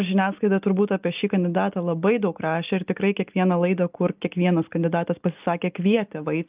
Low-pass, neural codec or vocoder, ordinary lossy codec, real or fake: 3.6 kHz; none; Opus, 24 kbps; real